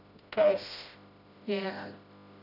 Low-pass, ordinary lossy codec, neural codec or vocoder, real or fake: 5.4 kHz; none; codec, 16 kHz, 0.5 kbps, FreqCodec, smaller model; fake